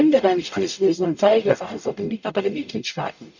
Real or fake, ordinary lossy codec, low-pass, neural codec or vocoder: fake; none; 7.2 kHz; codec, 44.1 kHz, 0.9 kbps, DAC